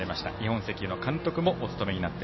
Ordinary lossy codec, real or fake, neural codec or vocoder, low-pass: MP3, 24 kbps; real; none; 7.2 kHz